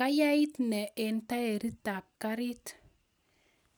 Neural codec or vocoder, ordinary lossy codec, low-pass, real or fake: none; none; none; real